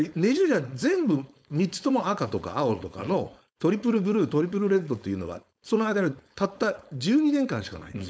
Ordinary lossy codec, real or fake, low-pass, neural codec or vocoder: none; fake; none; codec, 16 kHz, 4.8 kbps, FACodec